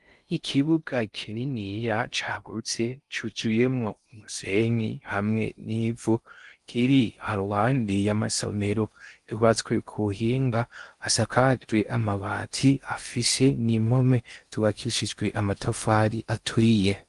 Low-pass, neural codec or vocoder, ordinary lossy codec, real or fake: 10.8 kHz; codec, 16 kHz in and 24 kHz out, 0.6 kbps, FocalCodec, streaming, 4096 codes; Opus, 32 kbps; fake